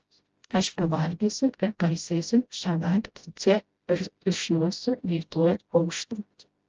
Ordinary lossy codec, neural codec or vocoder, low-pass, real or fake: Opus, 24 kbps; codec, 16 kHz, 0.5 kbps, FreqCodec, smaller model; 7.2 kHz; fake